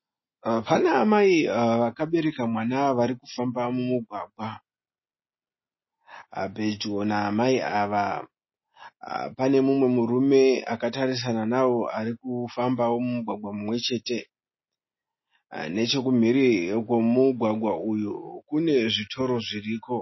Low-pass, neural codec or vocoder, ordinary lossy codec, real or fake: 7.2 kHz; none; MP3, 24 kbps; real